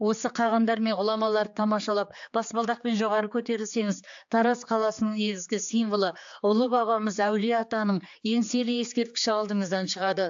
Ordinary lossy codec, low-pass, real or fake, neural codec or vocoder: AAC, 64 kbps; 7.2 kHz; fake; codec, 16 kHz, 4 kbps, X-Codec, HuBERT features, trained on general audio